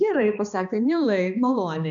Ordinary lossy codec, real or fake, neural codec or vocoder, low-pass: MP3, 96 kbps; fake; codec, 16 kHz, 2 kbps, X-Codec, HuBERT features, trained on balanced general audio; 7.2 kHz